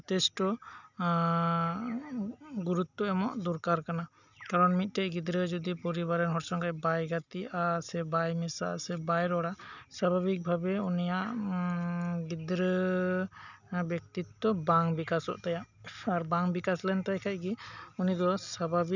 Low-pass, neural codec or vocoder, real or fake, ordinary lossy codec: 7.2 kHz; none; real; none